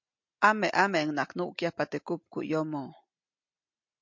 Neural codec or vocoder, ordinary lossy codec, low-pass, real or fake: none; MP3, 48 kbps; 7.2 kHz; real